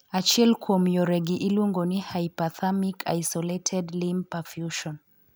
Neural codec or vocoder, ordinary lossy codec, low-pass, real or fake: none; none; none; real